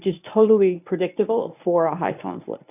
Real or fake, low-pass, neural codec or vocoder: fake; 3.6 kHz; codec, 24 kHz, 0.9 kbps, WavTokenizer, medium speech release version 1